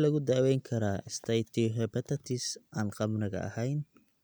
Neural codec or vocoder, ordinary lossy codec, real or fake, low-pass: none; none; real; none